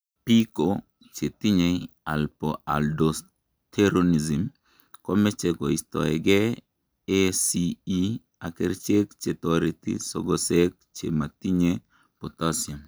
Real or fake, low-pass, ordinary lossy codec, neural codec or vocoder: real; none; none; none